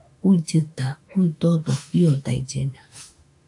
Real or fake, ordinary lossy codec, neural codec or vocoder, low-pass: fake; AAC, 64 kbps; autoencoder, 48 kHz, 32 numbers a frame, DAC-VAE, trained on Japanese speech; 10.8 kHz